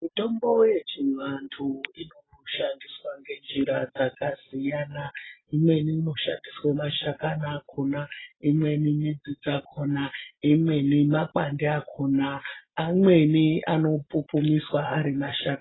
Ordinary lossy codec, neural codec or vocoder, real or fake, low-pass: AAC, 16 kbps; none; real; 7.2 kHz